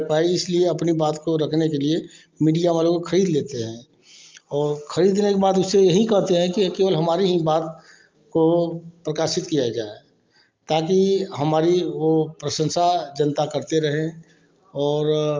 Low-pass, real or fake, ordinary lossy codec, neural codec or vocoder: 7.2 kHz; real; Opus, 24 kbps; none